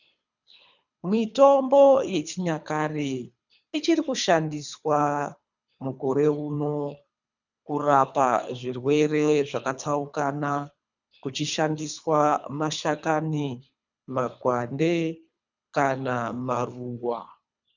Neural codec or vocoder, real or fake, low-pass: codec, 24 kHz, 3 kbps, HILCodec; fake; 7.2 kHz